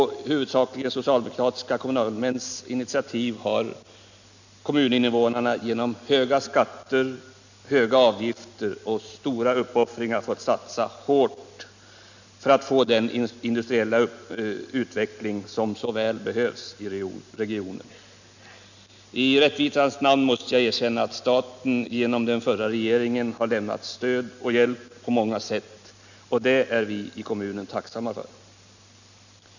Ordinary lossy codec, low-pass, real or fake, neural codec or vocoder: none; 7.2 kHz; real; none